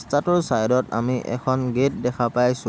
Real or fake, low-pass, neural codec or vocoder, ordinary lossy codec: real; none; none; none